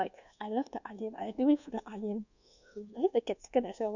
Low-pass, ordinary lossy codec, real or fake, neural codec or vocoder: 7.2 kHz; none; fake; codec, 24 kHz, 1.2 kbps, DualCodec